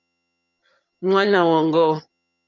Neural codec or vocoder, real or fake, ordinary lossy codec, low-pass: vocoder, 22.05 kHz, 80 mel bands, HiFi-GAN; fake; MP3, 64 kbps; 7.2 kHz